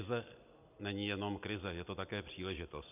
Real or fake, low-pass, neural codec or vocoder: real; 3.6 kHz; none